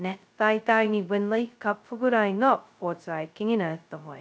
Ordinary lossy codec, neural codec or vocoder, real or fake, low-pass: none; codec, 16 kHz, 0.2 kbps, FocalCodec; fake; none